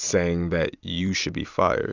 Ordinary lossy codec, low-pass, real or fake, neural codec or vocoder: Opus, 64 kbps; 7.2 kHz; fake; vocoder, 44.1 kHz, 128 mel bands every 256 samples, BigVGAN v2